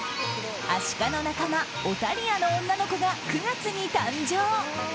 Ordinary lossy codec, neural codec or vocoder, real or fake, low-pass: none; none; real; none